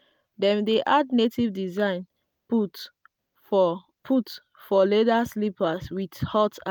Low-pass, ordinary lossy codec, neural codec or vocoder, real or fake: none; none; none; real